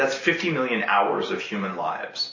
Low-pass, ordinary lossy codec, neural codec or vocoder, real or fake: 7.2 kHz; MP3, 32 kbps; none; real